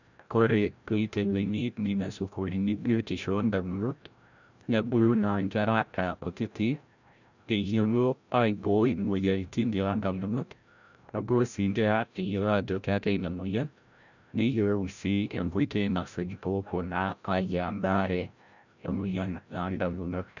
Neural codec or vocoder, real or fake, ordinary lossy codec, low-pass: codec, 16 kHz, 0.5 kbps, FreqCodec, larger model; fake; none; 7.2 kHz